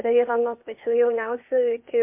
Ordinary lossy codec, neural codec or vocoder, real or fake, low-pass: MP3, 32 kbps; codec, 16 kHz, 0.8 kbps, ZipCodec; fake; 3.6 kHz